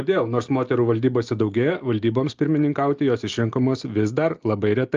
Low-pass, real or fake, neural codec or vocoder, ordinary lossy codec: 7.2 kHz; real; none; Opus, 24 kbps